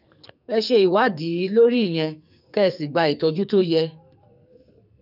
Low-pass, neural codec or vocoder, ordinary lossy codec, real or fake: 5.4 kHz; codec, 44.1 kHz, 2.6 kbps, SNAC; none; fake